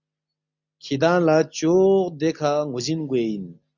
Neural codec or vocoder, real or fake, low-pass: none; real; 7.2 kHz